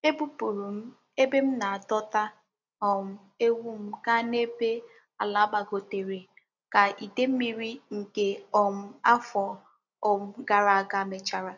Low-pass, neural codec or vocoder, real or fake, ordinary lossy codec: 7.2 kHz; none; real; none